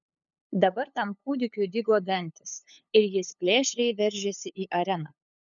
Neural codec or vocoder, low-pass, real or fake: codec, 16 kHz, 8 kbps, FunCodec, trained on LibriTTS, 25 frames a second; 7.2 kHz; fake